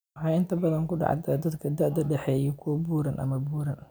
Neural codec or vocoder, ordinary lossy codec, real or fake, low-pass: none; none; real; none